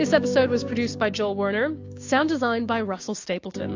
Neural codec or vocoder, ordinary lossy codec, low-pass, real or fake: codec, 16 kHz, 6 kbps, DAC; AAC, 48 kbps; 7.2 kHz; fake